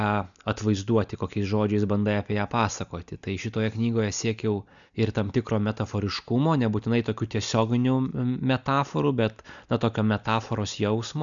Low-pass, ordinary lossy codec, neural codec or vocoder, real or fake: 7.2 kHz; MP3, 96 kbps; none; real